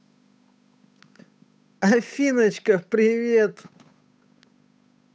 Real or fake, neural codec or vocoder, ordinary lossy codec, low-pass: fake; codec, 16 kHz, 8 kbps, FunCodec, trained on Chinese and English, 25 frames a second; none; none